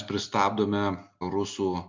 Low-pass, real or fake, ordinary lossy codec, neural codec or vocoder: 7.2 kHz; real; MP3, 64 kbps; none